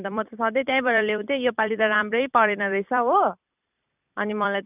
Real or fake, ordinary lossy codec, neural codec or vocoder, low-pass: fake; none; vocoder, 44.1 kHz, 128 mel bands every 256 samples, BigVGAN v2; 3.6 kHz